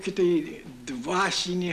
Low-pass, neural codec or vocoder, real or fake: 14.4 kHz; none; real